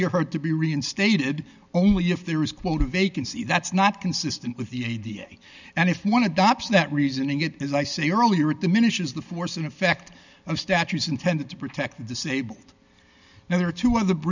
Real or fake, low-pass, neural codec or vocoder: real; 7.2 kHz; none